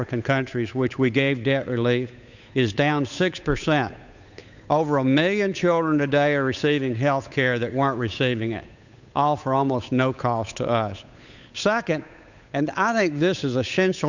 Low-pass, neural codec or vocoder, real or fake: 7.2 kHz; codec, 16 kHz, 8 kbps, FunCodec, trained on Chinese and English, 25 frames a second; fake